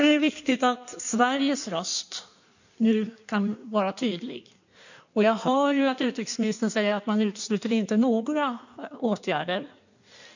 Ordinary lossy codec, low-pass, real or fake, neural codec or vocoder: none; 7.2 kHz; fake; codec, 16 kHz in and 24 kHz out, 1.1 kbps, FireRedTTS-2 codec